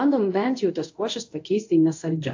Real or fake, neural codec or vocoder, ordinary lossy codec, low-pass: fake; codec, 24 kHz, 0.5 kbps, DualCodec; AAC, 48 kbps; 7.2 kHz